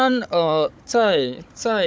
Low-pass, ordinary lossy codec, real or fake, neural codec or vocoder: none; none; fake; codec, 16 kHz, 16 kbps, FunCodec, trained on Chinese and English, 50 frames a second